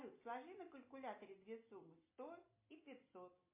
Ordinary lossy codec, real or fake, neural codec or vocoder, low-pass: MP3, 24 kbps; real; none; 3.6 kHz